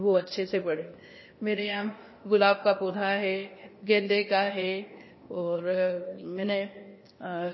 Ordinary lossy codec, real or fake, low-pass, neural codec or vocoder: MP3, 24 kbps; fake; 7.2 kHz; codec, 16 kHz, 0.8 kbps, ZipCodec